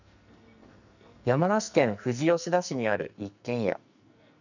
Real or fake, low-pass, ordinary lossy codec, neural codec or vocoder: fake; 7.2 kHz; none; codec, 44.1 kHz, 2.6 kbps, SNAC